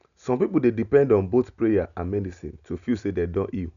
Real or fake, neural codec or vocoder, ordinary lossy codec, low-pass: real; none; none; 7.2 kHz